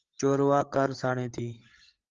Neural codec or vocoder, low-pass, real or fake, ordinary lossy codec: codec, 16 kHz, 8 kbps, FreqCodec, larger model; 7.2 kHz; fake; Opus, 32 kbps